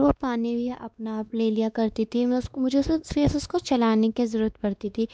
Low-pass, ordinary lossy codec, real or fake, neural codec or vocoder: none; none; fake; codec, 16 kHz, 2 kbps, X-Codec, WavLM features, trained on Multilingual LibriSpeech